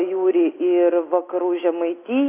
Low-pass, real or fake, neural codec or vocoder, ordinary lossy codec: 3.6 kHz; real; none; AAC, 24 kbps